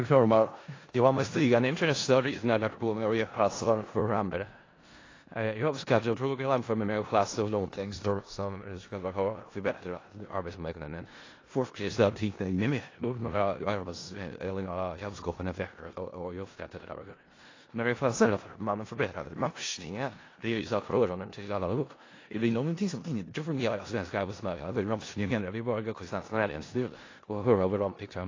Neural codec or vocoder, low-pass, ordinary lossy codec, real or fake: codec, 16 kHz in and 24 kHz out, 0.4 kbps, LongCat-Audio-Codec, four codebook decoder; 7.2 kHz; AAC, 32 kbps; fake